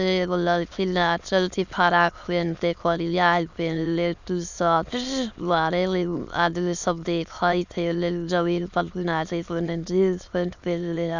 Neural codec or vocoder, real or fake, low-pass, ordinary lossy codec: autoencoder, 22.05 kHz, a latent of 192 numbers a frame, VITS, trained on many speakers; fake; 7.2 kHz; none